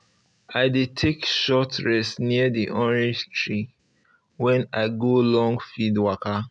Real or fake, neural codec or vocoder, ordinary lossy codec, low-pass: real; none; none; 9.9 kHz